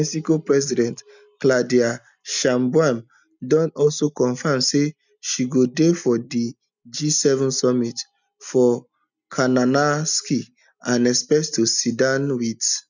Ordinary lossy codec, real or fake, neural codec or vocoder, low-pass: none; real; none; 7.2 kHz